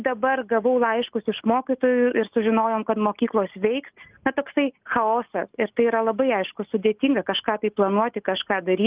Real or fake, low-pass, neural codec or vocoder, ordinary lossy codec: real; 3.6 kHz; none; Opus, 32 kbps